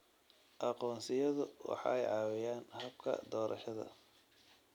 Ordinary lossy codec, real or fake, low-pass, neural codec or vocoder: none; real; 19.8 kHz; none